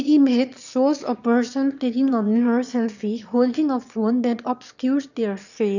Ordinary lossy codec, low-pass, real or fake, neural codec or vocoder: none; 7.2 kHz; fake; autoencoder, 22.05 kHz, a latent of 192 numbers a frame, VITS, trained on one speaker